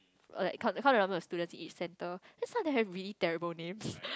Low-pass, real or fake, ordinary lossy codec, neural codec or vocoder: none; real; none; none